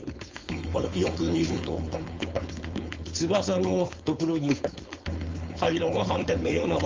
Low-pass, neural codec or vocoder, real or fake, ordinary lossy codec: 7.2 kHz; codec, 16 kHz, 4.8 kbps, FACodec; fake; Opus, 32 kbps